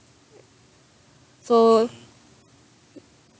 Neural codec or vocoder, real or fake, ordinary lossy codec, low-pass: none; real; none; none